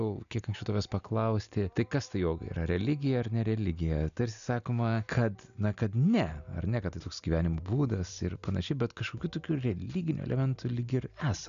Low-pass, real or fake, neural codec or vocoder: 7.2 kHz; real; none